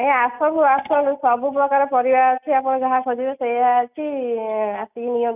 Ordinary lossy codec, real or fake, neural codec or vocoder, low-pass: none; real; none; 3.6 kHz